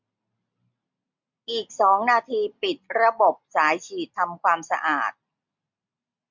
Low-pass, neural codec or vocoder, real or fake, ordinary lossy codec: 7.2 kHz; none; real; MP3, 64 kbps